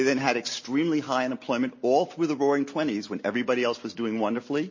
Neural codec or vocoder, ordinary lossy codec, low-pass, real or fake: none; MP3, 32 kbps; 7.2 kHz; real